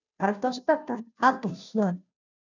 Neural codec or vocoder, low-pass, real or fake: codec, 16 kHz, 0.5 kbps, FunCodec, trained on Chinese and English, 25 frames a second; 7.2 kHz; fake